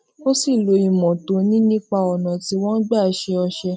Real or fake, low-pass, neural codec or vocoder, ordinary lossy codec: real; none; none; none